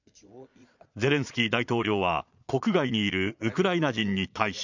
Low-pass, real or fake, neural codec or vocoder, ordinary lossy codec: 7.2 kHz; fake; vocoder, 22.05 kHz, 80 mel bands, Vocos; none